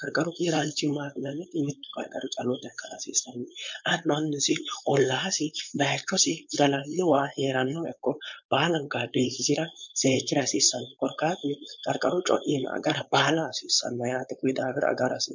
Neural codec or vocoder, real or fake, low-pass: codec, 16 kHz, 4.8 kbps, FACodec; fake; 7.2 kHz